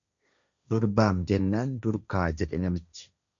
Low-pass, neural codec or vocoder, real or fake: 7.2 kHz; codec, 16 kHz, 1.1 kbps, Voila-Tokenizer; fake